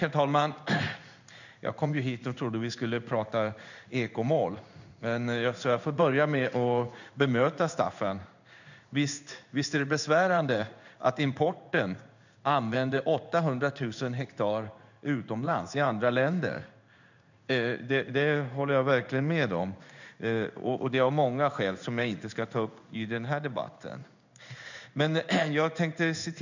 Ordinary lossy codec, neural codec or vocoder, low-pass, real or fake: none; codec, 16 kHz in and 24 kHz out, 1 kbps, XY-Tokenizer; 7.2 kHz; fake